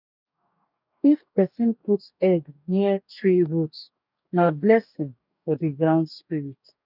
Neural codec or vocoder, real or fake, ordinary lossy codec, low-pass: codec, 44.1 kHz, 2.6 kbps, DAC; fake; none; 5.4 kHz